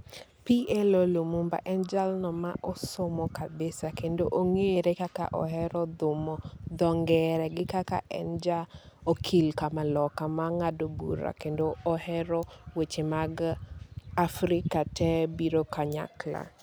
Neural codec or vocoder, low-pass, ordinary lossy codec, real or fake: none; none; none; real